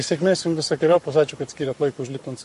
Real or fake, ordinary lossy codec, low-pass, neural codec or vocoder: fake; MP3, 48 kbps; 14.4 kHz; codec, 44.1 kHz, 7.8 kbps, Pupu-Codec